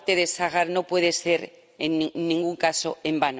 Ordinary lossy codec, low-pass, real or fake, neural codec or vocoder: none; none; real; none